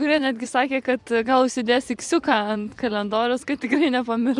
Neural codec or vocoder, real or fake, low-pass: vocoder, 24 kHz, 100 mel bands, Vocos; fake; 10.8 kHz